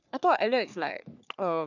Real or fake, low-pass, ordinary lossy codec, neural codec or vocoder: fake; 7.2 kHz; none; codec, 44.1 kHz, 3.4 kbps, Pupu-Codec